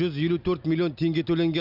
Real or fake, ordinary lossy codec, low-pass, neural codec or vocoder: real; none; 5.4 kHz; none